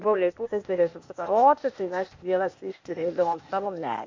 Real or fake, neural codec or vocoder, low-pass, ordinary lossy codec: fake; codec, 16 kHz, 0.8 kbps, ZipCodec; 7.2 kHz; MP3, 48 kbps